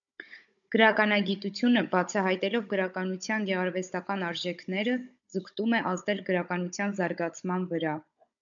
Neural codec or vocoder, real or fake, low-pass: codec, 16 kHz, 16 kbps, FunCodec, trained on Chinese and English, 50 frames a second; fake; 7.2 kHz